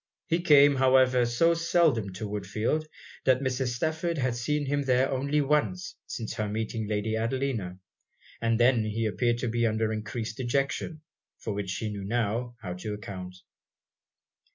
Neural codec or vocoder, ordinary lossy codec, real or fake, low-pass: none; MP3, 64 kbps; real; 7.2 kHz